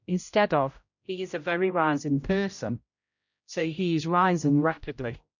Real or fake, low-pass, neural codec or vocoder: fake; 7.2 kHz; codec, 16 kHz, 0.5 kbps, X-Codec, HuBERT features, trained on general audio